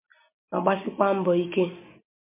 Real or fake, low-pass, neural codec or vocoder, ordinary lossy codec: real; 3.6 kHz; none; MP3, 32 kbps